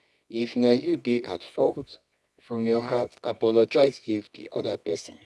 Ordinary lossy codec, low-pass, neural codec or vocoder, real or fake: none; none; codec, 24 kHz, 0.9 kbps, WavTokenizer, medium music audio release; fake